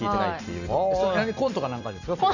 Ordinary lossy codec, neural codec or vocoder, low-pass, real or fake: none; none; 7.2 kHz; real